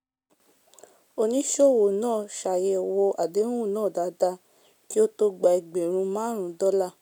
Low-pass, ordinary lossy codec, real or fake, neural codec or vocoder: none; none; real; none